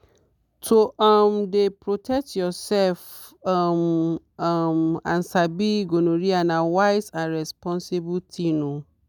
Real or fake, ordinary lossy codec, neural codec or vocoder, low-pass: real; none; none; 19.8 kHz